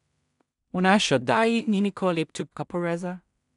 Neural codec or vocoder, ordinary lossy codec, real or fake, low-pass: codec, 16 kHz in and 24 kHz out, 0.4 kbps, LongCat-Audio-Codec, two codebook decoder; none; fake; 10.8 kHz